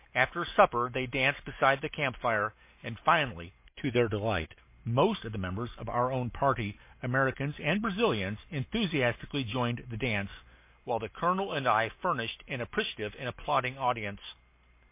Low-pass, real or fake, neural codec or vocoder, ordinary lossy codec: 3.6 kHz; real; none; MP3, 24 kbps